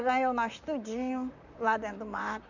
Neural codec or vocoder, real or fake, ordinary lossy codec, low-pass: vocoder, 44.1 kHz, 128 mel bands, Pupu-Vocoder; fake; none; 7.2 kHz